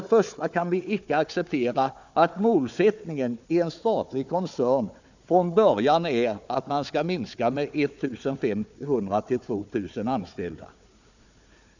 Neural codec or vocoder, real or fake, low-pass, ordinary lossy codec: codec, 16 kHz, 4 kbps, FunCodec, trained on Chinese and English, 50 frames a second; fake; 7.2 kHz; none